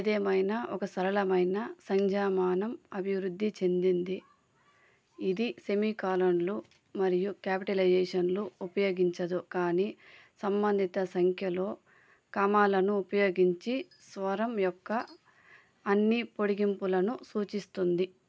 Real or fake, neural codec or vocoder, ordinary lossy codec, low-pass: real; none; none; none